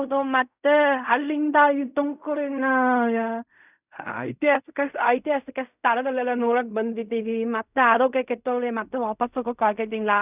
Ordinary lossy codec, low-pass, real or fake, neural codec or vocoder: none; 3.6 kHz; fake; codec, 16 kHz in and 24 kHz out, 0.4 kbps, LongCat-Audio-Codec, fine tuned four codebook decoder